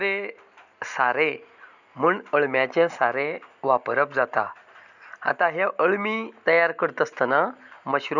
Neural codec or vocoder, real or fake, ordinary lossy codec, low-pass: none; real; none; 7.2 kHz